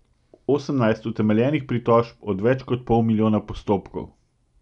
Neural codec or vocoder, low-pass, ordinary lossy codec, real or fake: none; 10.8 kHz; none; real